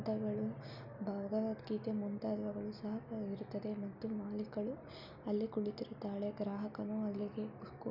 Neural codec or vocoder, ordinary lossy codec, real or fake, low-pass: none; none; real; 5.4 kHz